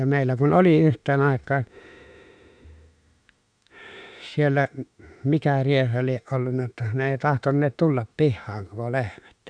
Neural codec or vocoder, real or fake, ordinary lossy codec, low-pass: autoencoder, 48 kHz, 32 numbers a frame, DAC-VAE, trained on Japanese speech; fake; none; 9.9 kHz